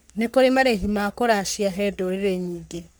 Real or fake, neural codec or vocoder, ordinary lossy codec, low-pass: fake; codec, 44.1 kHz, 3.4 kbps, Pupu-Codec; none; none